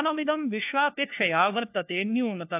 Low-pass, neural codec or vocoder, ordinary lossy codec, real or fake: 3.6 kHz; codec, 16 kHz, 1 kbps, FunCodec, trained on LibriTTS, 50 frames a second; AAC, 32 kbps; fake